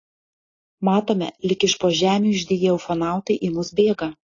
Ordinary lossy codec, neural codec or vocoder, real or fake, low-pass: AAC, 32 kbps; none; real; 7.2 kHz